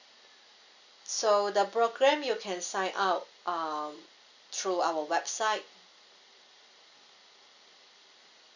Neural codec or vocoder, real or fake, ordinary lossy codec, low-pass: none; real; none; 7.2 kHz